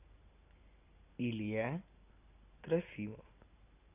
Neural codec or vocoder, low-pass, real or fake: none; 3.6 kHz; real